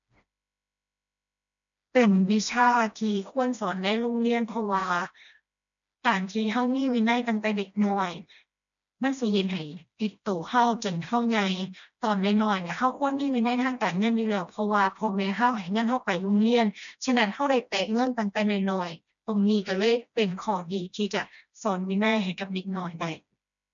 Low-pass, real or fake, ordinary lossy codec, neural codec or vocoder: 7.2 kHz; fake; none; codec, 16 kHz, 1 kbps, FreqCodec, smaller model